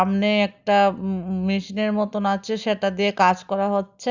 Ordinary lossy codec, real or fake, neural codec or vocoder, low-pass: Opus, 64 kbps; real; none; 7.2 kHz